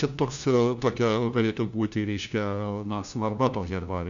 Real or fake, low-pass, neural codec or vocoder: fake; 7.2 kHz; codec, 16 kHz, 1 kbps, FunCodec, trained on LibriTTS, 50 frames a second